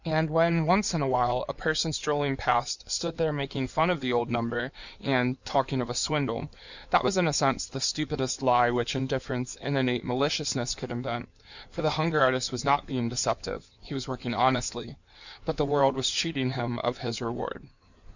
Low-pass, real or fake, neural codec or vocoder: 7.2 kHz; fake; codec, 16 kHz in and 24 kHz out, 2.2 kbps, FireRedTTS-2 codec